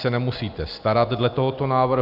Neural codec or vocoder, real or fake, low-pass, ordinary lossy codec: none; real; 5.4 kHz; AAC, 48 kbps